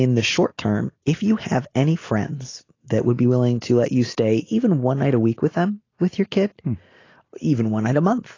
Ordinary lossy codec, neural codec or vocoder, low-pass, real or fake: AAC, 32 kbps; none; 7.2 kHz; real